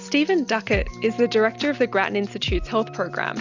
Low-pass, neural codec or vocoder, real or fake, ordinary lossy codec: 7.2 kHz; none; real; Opus, 64 kbps